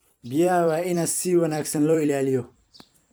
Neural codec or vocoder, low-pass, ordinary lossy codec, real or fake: vocoder, 44.1 kHz, 128 mel bands every 256 samples, BigVGAN v2; none; none; fake